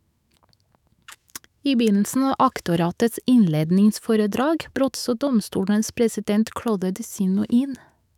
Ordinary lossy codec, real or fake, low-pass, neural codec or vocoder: none; fake; 19.8 kHz; autoencoder, 48 kHz, 128 numbers a frame, DAC-VAE, trained on Japanese speech